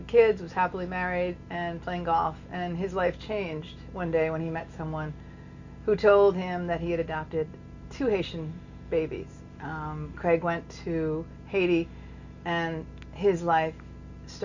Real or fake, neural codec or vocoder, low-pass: real; none; 7.2 kHz